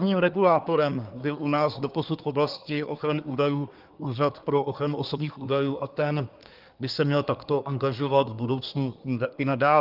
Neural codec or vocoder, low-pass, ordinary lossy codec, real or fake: codec, 24 kHz, 1 kbps, SNAC; 5.4 kHz; Opus, 32 kbps; fake